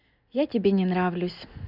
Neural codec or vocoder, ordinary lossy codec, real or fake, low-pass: none; none; real; 5.4 kHz